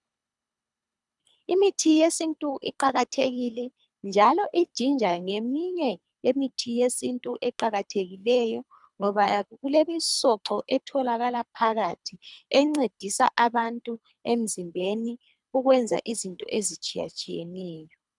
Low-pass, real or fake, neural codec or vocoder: 10.8 kHz; fake; codec, 24 kHz, 3 kbps, HILCodec